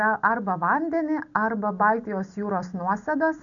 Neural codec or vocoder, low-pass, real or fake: none; 7.2 kHz; real